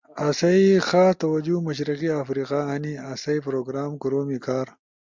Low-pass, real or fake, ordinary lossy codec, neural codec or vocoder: 7.2 kHz; real; MP3, 64 kbps; none